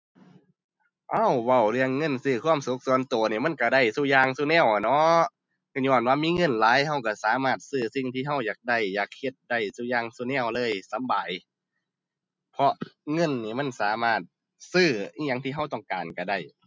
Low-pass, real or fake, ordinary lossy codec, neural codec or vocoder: none; real; none; none